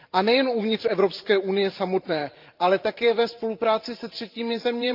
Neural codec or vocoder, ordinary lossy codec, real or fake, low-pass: vocoder, 44.1 kHz, 128 mel bands every 512 samples, BigVGAN v2; Opus, 24 kbps; fake; 5.4 kHz